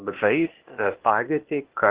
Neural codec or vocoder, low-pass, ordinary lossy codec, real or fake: codec, 16 kHz, 0.8 kbps, ZipCodec; 3.6 kHz; Opus, 16 kbps; fake